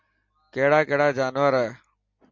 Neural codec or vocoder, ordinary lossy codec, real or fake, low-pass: none; MP3, 64 kbps; real; 7.2 kHz